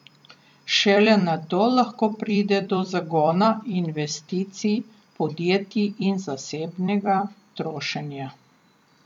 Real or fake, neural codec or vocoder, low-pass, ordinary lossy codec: fake; vocoder, 44.1 kHz, 128 mel bands every 256 samples, BigVGAN v2; 19.8 kHz; none